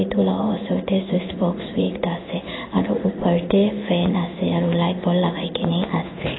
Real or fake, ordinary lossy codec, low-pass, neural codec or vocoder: fake; AAC, 16 kbps; 7.2 kHz; codec, 16 kHz in and 24 kHz out, 1 kbps, XY-Tokenizer